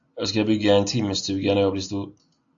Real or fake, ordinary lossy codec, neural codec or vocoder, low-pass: real; AAC, 64 kbps; none; 7.2 kHz